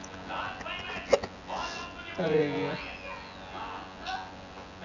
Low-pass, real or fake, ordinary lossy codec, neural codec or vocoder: 7.2 kHz; fake; none; vocoder, 24 kHz, 100 mel bands, Vocos